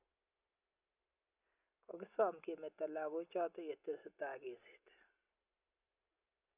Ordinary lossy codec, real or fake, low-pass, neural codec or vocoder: none; real; 3.6 kHz; none